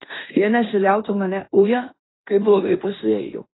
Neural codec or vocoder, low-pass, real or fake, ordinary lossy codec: codec, 16 kHz in and 24 kHz out, 0.9 kbps, LongCat-Audio-Codec, fine tuned four codebook decoder; 7.2 kHz; fake; AAC, 16 kbps